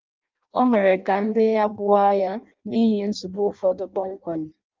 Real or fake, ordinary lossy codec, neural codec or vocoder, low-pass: fake; Opus, 32 kbps; codec, 16 kHz in and 24 kHz out, 0.6 kbps, FireRedTTS-2 codec; 7.2 kHz